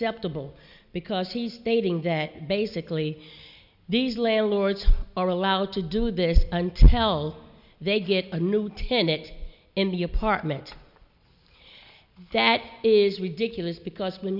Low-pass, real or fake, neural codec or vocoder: 5.4 kHz; real; none